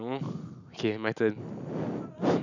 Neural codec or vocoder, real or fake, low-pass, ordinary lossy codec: none; real; 7.2 kHz; none